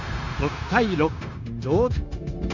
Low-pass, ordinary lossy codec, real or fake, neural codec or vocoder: 7.2 kHz; Opus, 64 kbps; fake; codec, 16 kHz, 0.9 kbps, LongCat-Audio-Codec